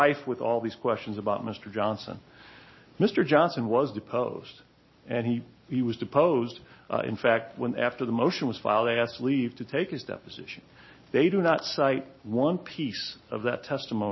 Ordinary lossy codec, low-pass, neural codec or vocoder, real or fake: MP3, 24 kbps; 7.2 kHz; none; real